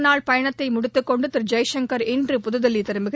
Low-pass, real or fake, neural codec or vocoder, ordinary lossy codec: none; real; none; none